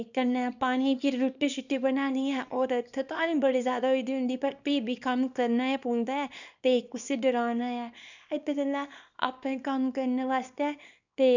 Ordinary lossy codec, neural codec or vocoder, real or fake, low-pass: none; codec, 24 kHz, 0.9 kbps, WavTokenizer, small release; fake; 7.2 kHz